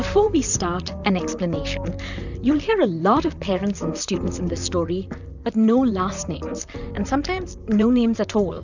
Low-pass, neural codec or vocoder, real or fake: 7.2 kHz; vocoder, 44.1 kHz, 128 mel bands, Pupu-Vocoder; fake